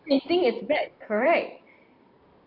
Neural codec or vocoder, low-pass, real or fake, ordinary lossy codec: vocoder, 44.1 kHz, 128 mel bands every 512 samples, BigVGAN v2; 5.4 kHz; fake; AAC, 32 kbps